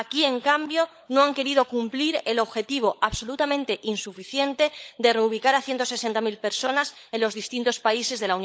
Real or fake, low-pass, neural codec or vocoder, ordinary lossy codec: fake; none; codec, 16 kHz, 16 kbps, FunCodec, trained on LibriTTS, 50 frames a second; none